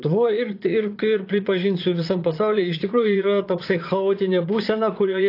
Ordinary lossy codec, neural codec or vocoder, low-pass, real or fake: AAC, 32 kbps; vocoder, 22.05 kHz, 80 mel bands, Vocos; 5.4 kHz; fake